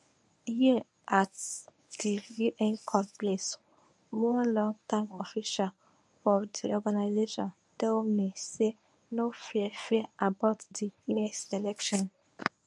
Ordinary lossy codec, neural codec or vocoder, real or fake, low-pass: none; codec, 24 kHz, 0.9 kbps, WavTokenizer, medium speech release version 1; fake; none